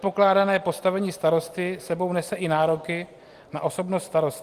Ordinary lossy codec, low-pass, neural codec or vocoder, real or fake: Opus, 24 kbps; 14.4 kHz; none; real